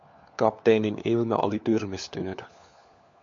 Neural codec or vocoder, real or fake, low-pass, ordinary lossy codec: codec, 16 kHz, 4 kbps, FunCodec, trained on LibriTTS, 50 frames a second; fake; 7.2 kHz; AAC, 64 kbps